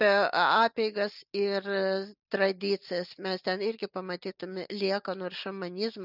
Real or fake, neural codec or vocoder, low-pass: real; none; 5.4 kHz